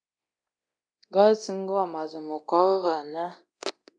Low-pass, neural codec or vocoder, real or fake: 9.9 kHz; codec, 24 kHz, 0.9 kbps, DualCodec; fake